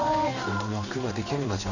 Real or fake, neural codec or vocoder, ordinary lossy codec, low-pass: fake; codec, 16 kHz in and 24 kHz out, 1 kbps, XY-Tokenizer; none; 7.2 kHz